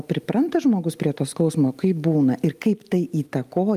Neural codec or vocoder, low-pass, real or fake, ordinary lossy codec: none; 14.4 kHz; real; Opus, 32 kbps